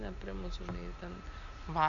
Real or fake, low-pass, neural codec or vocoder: real; 7.2 kHz; none